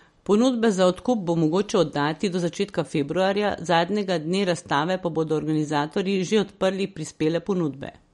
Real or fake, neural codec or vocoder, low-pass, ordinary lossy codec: real; none; 19.8 kHz; MP3, 48 kbps